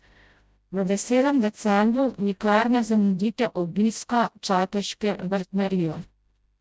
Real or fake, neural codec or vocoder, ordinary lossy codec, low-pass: fake; codec, 16 kHz, 0.5 kbps, FreqCodec, smaller model; none; none